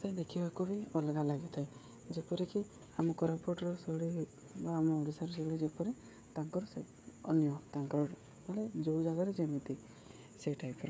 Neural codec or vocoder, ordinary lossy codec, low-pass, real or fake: codec, 16 kHz, 16 kbps, FreqCodec, smaller model; none; none; fake